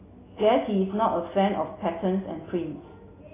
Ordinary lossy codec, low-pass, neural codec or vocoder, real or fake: AAC, 16 kbps; 3.6 kHz; none; real